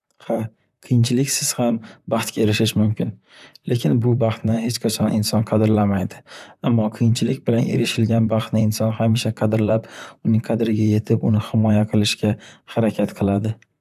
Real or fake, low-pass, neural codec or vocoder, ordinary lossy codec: fake; 14.4 kHz; vocoder, 44.1 kHz, 128 mel bands, Pupu-Vocoder; none